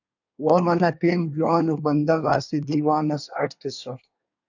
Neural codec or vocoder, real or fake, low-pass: codec, 24 kHz, 1 kbps, SNAC; fake; 7.2 kHz